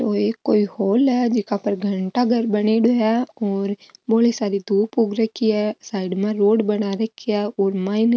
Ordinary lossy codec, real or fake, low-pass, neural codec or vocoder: none; real; none; none